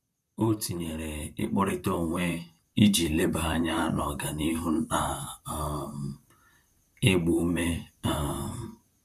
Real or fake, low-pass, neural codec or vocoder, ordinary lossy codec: fake; 14.4 kHz; vocoder, 44.1 kHz, 128 mel bands, Pupu-Vocoder; none